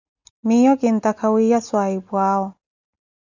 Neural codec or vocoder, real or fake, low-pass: none; real; 7.2 kHz